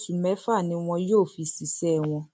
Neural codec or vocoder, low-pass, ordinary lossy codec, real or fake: none; none; none; real